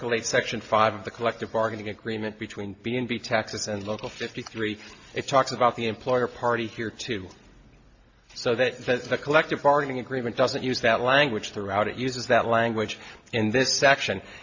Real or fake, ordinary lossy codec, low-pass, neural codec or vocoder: real; AAC, 48 kbps; 7.2 kHz; none